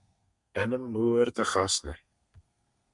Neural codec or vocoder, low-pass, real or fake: codec, 32 kHz, 1.9 kbps, SNAC; 10.8 kHz; fake